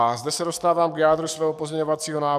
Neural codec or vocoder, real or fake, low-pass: codec, 44.1 kHz, 7.8 kbps, DAC; fake; 14.4 kHz